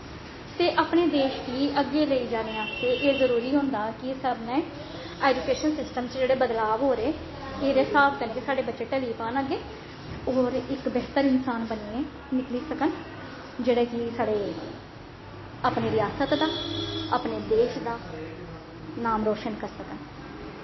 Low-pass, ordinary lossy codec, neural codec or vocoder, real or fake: 7.2 kHz; MP3, 24 kbps; none; real